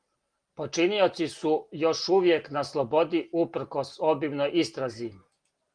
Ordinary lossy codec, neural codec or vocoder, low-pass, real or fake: Opus, 24 kbps; none; 9.9 kHz; real